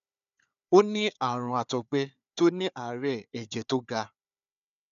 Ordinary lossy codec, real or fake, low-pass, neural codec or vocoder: none; fake; 7.2 kHz; codec, 16 kHz, 4 kbps, FunCodec, trained on Chinese and English, 50 frames a second